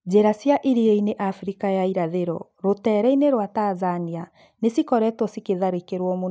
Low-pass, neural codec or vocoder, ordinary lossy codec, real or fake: none; none; none; real